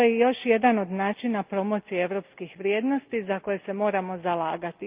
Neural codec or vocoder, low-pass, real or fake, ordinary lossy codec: none; 3.6 kHz; real; Opus, 24 kbps